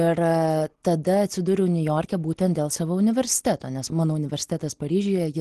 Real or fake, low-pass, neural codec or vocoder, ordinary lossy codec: real; 10.8 kHz; none; Opus, 16 kbps